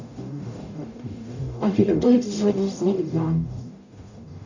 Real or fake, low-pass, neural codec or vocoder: fake; 7.2 kHz; codec, 44.1 kHz, 0.9 kbps, DAC